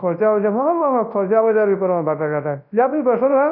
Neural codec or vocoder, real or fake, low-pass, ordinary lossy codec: codec, 24 kHz, 0.9 kbps, WavTokenizer, large speech release; fake; 5.4 kHz; none